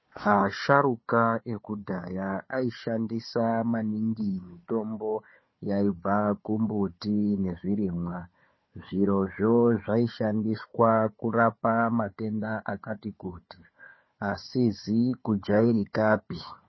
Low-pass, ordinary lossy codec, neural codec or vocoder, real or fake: 7.2 kHz; MP3, 24 kbps; codec, 16 kHz, 4 kbps, FunCodec, trained on Chinese and English, 50 frames a second; fake